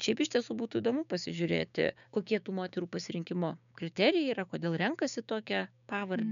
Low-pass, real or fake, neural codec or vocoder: 7.2 kHz; fake; codec, 16 kHz, 6 kbps, DAC